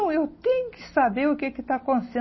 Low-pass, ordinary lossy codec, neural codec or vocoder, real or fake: 7.2 kHz; MP3, 24 kbps; none; real